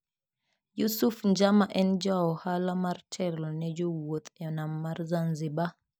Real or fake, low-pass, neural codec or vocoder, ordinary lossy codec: real; none; none; none